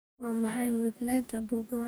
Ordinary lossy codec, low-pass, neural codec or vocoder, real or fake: none; none; codec, 44.1 kHz, 2.6 kbps, DAC; fake